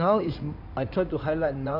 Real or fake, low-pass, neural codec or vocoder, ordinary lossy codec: fake; 5.4 kHz; codec, 16 kHz in and 24 kHz out, 2.2 kbps, FireRedTTS-2 codec; none